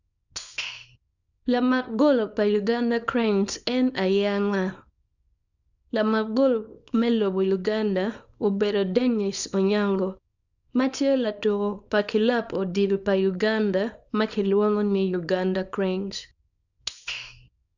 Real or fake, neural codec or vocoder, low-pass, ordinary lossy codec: fake; codec, 24 kHz, 0.9 kbps, WavTokenizer, small release; 7.2 kHz; none